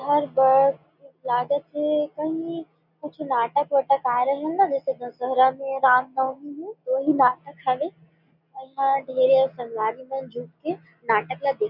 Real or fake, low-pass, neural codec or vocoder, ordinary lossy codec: real; 5.4 kHz; none; none